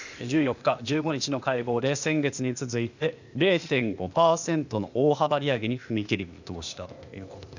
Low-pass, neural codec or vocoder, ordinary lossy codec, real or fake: 7.2 kHz; codec, 16 kHz, 0.8 kbps, ZipCodec; none; fake